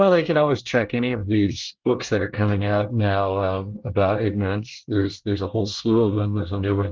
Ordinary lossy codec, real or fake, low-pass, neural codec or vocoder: Opus, 16 kbps; fake; 7.2 kHz; codec, 24 kHz, 1 kbps, SNAC